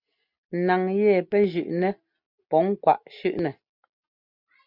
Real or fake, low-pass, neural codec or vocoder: real; 5.4 kHz; none